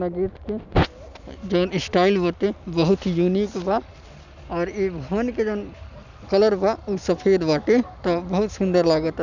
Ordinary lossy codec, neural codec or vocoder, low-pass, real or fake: none; none; 7.2 kHz; real